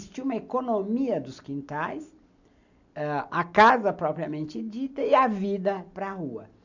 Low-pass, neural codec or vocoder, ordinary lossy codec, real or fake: 7.2 kHz; none; none; real